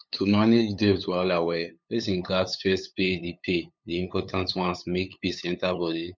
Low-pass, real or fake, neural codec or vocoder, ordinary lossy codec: none; fake; codec, 16 kHz, 8 kbps, FunCodec, trained on LibriTTS, 25 frames a second; none